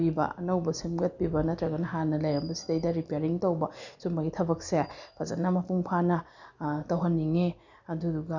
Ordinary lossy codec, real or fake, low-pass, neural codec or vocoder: none; real; 7.2 kHz; none